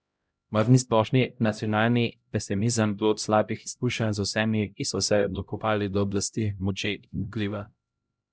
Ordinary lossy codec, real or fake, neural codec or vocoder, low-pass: none; fake; codec, 16 kHz, 0.5 kbps, X-Codec, HuBERT features, trained on LibriSpeech; none